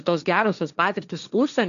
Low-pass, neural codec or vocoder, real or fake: 7.2 kHz; codec, 16 kHz, 1.1 kbps, Voila-Tokenizer; fake